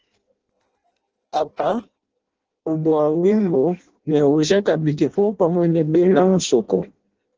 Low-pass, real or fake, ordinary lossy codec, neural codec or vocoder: 7.2 kHz; fake; Opus, 16 kbps; codec, 16 kHz in and 24 kHz out, 0.6 kbps, FireRedTTS-2 codec